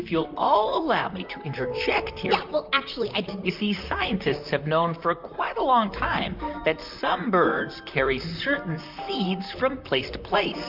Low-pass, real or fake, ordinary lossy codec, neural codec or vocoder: 5.4 kHz; fake; MP3, 48 kbps; vocoder, 44.1 kHz, 128 mel bands, Pupu-Vocoder